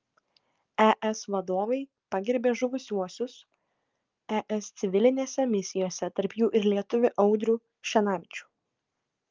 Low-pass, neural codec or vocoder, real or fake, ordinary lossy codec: 7.2 kHz; none; real; Opus, 24 kbps